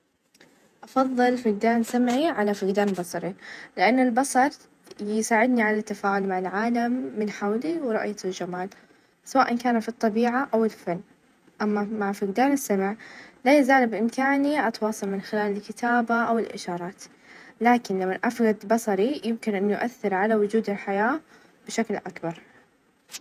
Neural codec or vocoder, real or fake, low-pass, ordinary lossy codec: vocoder, 48 kHz, 128 mel bands, Vocos; fake; 14.4 kHz; none